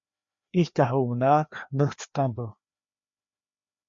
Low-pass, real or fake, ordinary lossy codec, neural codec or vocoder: 7.2 kHz; fake; MP3, 48 kbps; codec, 16 kHz, 2 kbps, FreqCodec, larger model